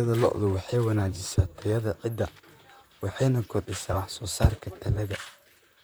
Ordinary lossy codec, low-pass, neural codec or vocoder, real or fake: none; none; vocoder, 44.1 kHz, 128 mel bands, Pupu-Vocoder; fake